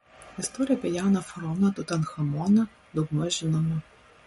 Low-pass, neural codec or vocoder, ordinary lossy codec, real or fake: 19.8 kHz; none; MP3, 48 kbps; real